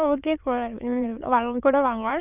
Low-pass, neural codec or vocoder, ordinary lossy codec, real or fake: 3.6 kHz; autoencoder, 22.05 kHz, a latent of 192 numbers a frame, VITS, trained on many speakers; none; fake